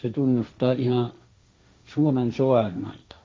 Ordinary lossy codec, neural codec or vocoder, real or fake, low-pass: none; codec, 16 kHz, 1.1 kbps, Voila-Tokenizer; fake; none